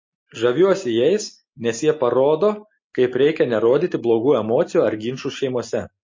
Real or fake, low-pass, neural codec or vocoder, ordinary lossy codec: real; 7.2 kHz; none; MP3, 32 kbps